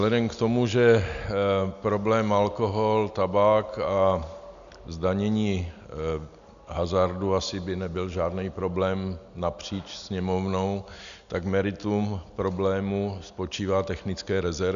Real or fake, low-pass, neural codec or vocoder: real; 7.2 kHz; none